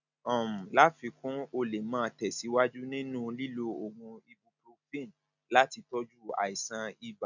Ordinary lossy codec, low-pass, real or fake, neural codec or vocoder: none; 7.2 kHz; real; none